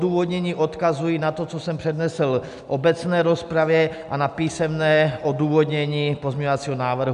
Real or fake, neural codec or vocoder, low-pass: real; none; 10.8 kHz